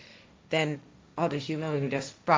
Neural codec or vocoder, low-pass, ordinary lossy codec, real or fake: codec, 16 kHz, 1.1 kbps, Voila-Tokenizer; none; none; fake